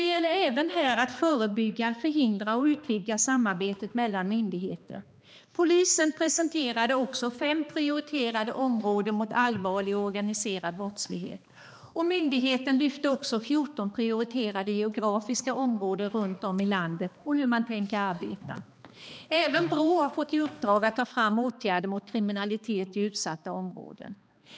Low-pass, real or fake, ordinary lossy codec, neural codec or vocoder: none; fake; none; codec, 16 kHz, 2 kbps, X-Codec, HuBERT features, trained on balanced general audio